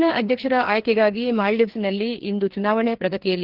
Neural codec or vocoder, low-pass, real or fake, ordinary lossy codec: codec, 16 kHz, 2 kbps, FreqCodec, larger model; 5.4 kHz; fake; Opus, 16 kbps